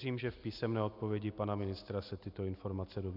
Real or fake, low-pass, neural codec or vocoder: real; 5.4 kHz; none